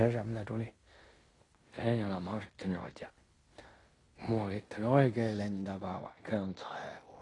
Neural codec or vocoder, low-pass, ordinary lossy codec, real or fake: codec, 16 kHz in and 24 kHz out, 0.9 kbps, LongCat-Audio-Codec, fine tuned four codebook decoder; 10.8 kHz; AAC, 32 kbps; fake